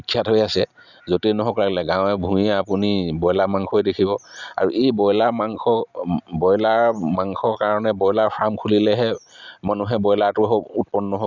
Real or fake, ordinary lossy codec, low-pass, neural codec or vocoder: real; none; 7.2 kHz; none